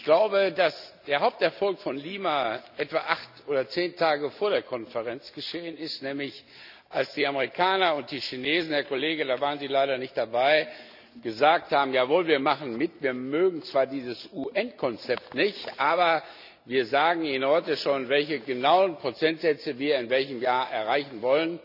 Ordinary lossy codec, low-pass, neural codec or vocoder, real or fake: none; 5.4 kHz; none; real